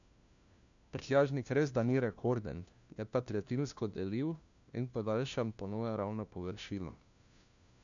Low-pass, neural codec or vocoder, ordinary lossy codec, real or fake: 7.2 kHz; codec, 16 kHz, 1 kbps, FunCodec, trained on LibriTTS, 50 frames a second; none; fake